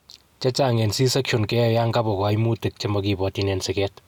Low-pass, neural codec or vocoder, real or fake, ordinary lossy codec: 19.8 kHz; none; real; none